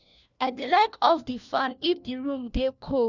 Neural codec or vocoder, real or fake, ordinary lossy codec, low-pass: codec, 16 kHz, 1 kbps, FunCodec, trained on LibriTTS, 50 frames a second; fake; none; 7.2 kHz